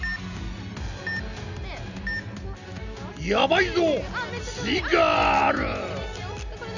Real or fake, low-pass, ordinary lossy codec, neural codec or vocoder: real; 7.2 kHz; none; none